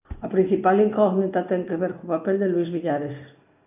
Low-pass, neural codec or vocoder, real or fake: 3.6 kHz; none; real